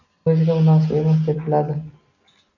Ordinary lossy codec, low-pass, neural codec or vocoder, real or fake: AAC, 48 kbps; 7.2 kHz; none; real